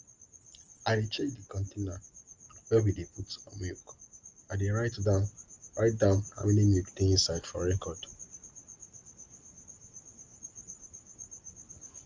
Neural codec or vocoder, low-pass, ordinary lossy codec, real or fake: none; 7.2 kHz; Opus, 24 kbps; real